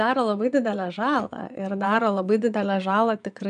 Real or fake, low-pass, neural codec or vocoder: fake; 9.9 kHz; vocoder, 22.05 kHz, 80 mel bands, Vocos